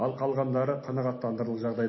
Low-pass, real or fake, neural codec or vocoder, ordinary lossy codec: 7.2 kHz; real; none; MP3, 24 kbps